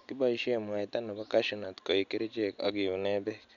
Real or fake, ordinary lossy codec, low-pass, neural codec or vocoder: real; none; 7.2 kHz; none